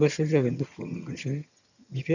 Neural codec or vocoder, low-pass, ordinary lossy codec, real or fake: vocoder, 22.05 kHz, 80 mel bands, HiFi-GAN; 7.2 kHz; none; fake